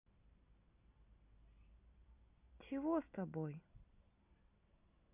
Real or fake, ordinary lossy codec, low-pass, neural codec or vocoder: real; none; 3.6 kHz; none